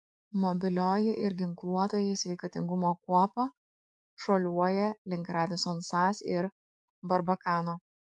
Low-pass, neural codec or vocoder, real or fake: 10.8 kHz; codec, 44.1 kHz, 7.8 kbps, DAC; fake